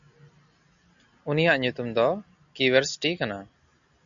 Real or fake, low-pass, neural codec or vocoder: real; 7.2 kHz; none